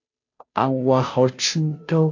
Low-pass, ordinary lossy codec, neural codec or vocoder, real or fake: 7.2 kHz; AAC, 48 kbps; codec, 16 kHz, 0.5 kbps, FunCodec, trained on Chinese and English, 25 frames a second; fake